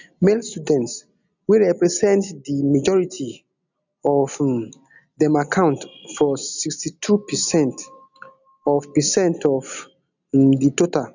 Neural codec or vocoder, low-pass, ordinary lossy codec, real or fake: none; 7.2 kHz; none; real